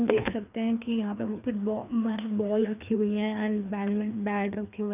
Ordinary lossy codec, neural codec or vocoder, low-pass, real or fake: none; codec, 16 kHz, 2 kbps, FreqCodec, larger model; 3.6 kHz; fake